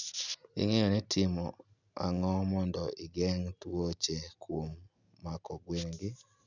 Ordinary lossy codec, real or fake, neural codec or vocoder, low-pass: none; real; none; 7.2 kHz